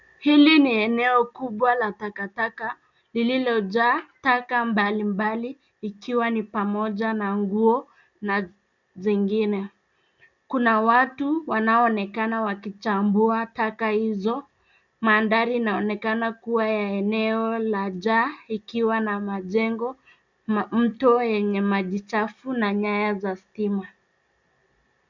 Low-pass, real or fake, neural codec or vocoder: 7.2 kHz; real; none